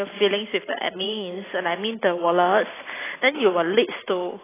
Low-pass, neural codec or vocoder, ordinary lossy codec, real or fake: 3.6 kHz; vocoder, 44.1 kHz, 128 mel bands every 512 samples, BigVGAN v2; AAC, 16 kbps; fake